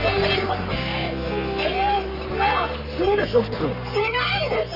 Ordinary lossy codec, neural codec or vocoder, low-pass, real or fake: none; codec, 44.1 kHz, 3.4 kbps, Pupu-Codec; 5.4 kHz; fake